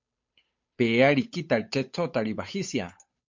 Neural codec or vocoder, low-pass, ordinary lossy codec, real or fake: codec, 16 kHz, 8 kbps, FunCodec, trained on Chinese and English, 25 frames a second; 7.2 kHz; MP3, 48 kbps; fake